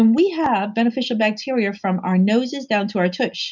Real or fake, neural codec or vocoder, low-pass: real; none; 7.2 kHz